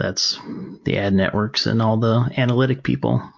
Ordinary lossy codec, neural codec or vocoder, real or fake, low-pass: MP3, 48 kbps; none; real; 7.2 kHz